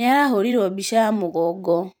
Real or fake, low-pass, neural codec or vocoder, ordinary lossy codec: fake; none; vocoder, 44.1 kHz, 128 mel bands every 512 samples, BigVGAN v2; none